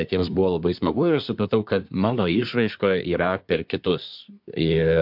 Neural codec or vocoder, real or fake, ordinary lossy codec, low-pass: codec, 24 kHz, 1 kbps, SNAC; fake; MP3, 48 kbps; 5.4 kHz